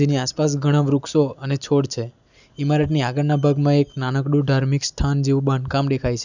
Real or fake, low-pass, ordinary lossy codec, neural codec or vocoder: real; 7.2 kHz; none; none